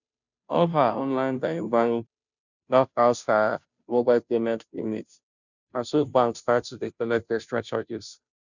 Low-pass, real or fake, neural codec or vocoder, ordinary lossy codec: 7.2 kHz; fake; codec, 16 kHz, 0.5 kbps, FunCodec, trained on Chinese and English, 25 frames a second; none